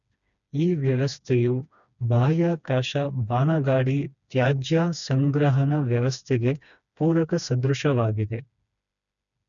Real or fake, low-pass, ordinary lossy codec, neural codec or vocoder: fake; 7.2 kHz; none; codec, 16 kHz, 2 kbps, FreqCodec, smaller model